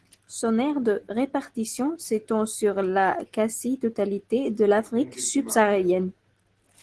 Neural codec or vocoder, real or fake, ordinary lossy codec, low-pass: none; real; Opus, 16 kbps; 10.8 kHz